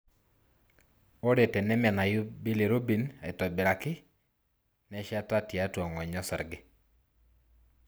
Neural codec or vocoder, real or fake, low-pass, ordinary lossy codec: none; real; none; none